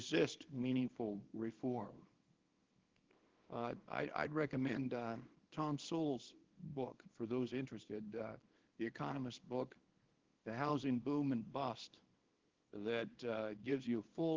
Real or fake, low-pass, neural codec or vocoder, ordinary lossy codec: fake; 7.2 kHz; codec, 24 kHz, 0.9 kbps, WavTokenizer, small release; Opus, 16 kbps